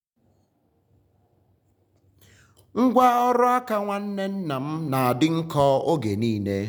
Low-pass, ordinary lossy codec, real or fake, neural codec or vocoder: none; none; real; none